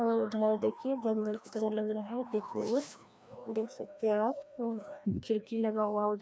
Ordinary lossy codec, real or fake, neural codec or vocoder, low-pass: none; fake; codec, 16 kHz, 1 kbps, FreqCodec, larger model; none